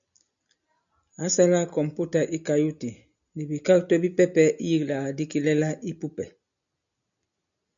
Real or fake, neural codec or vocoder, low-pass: real; none; 7.2 kHz